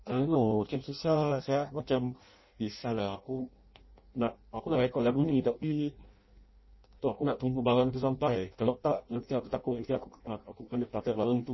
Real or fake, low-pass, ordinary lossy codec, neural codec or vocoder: fake; 7.2 kHz; MP3, 24 kbps; codec, 16 kHz in and 24 kHz out, 0.6 kbps, FireRedTTS-2 codec